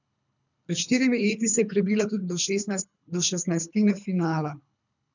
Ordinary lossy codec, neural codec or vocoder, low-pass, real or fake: none; codec, 24 kHz, 3 kbps, HILCodec; 7.2 kHz; fake